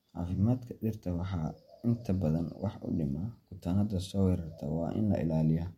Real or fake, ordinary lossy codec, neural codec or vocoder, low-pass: real; MP3, 64 kbps; none; 19.8 kHz